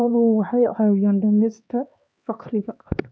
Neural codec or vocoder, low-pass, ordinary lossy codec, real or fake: codec, 16 kHz, 2 kbps, X-Codec, HuBERT features, trained on LibriSpeech; none; none; fake